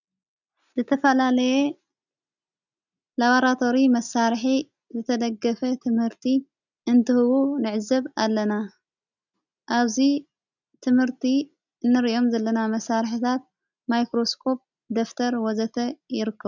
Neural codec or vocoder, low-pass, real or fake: none; 7.2 kHz; real